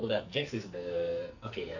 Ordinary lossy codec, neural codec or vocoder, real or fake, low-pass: none; codec, 44.1 kHz, 2.6 kbps, SNAC; fake; 7.2 kHz